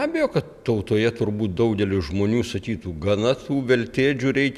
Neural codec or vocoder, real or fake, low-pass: none; real; 14.4 kHz